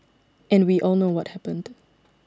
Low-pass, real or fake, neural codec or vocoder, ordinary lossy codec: none; real; none; none